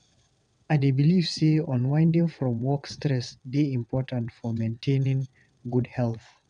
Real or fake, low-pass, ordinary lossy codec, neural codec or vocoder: fake; 9.9 kHz; none; vocoder, 22.05 kHz, 80 mel bands, WaveNeXt